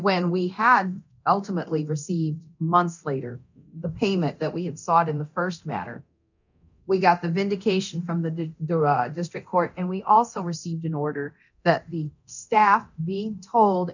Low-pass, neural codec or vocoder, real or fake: 7.2 kHz; codec, 24 kHz, 0.9 kbps, DualCodec; fake